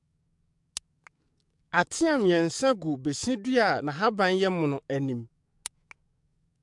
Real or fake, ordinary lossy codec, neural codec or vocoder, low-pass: fake; MP3, 64 kbps; codec, 44.1 kHz, 7.8 kbps, DAC; 10.8 kHz